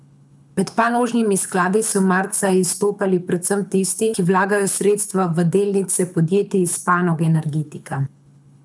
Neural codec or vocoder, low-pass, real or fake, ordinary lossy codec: codec, 24 kHz, 6 kbps, HILCodec; none; fake; none